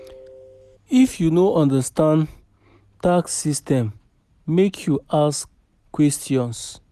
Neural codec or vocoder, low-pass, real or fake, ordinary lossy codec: none; 14.4 kHz; real; none